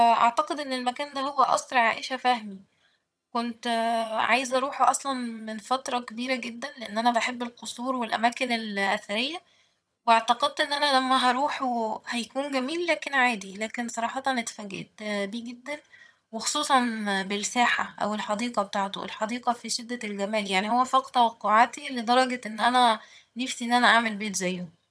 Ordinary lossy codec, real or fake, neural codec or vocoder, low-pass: none; fake; vocoder, 22.05 kHz, 80 mel bands, HiFi-GAN; none